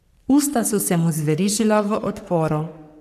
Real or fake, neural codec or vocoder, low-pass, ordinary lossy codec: fake; codec, 44.1 kHz, 3.4 kbps, Pupu-Codec; 14.4 kHz; none